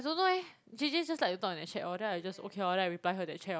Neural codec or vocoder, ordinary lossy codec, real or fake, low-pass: none; none; real; none